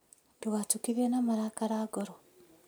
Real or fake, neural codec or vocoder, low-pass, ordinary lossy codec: real; none; none; none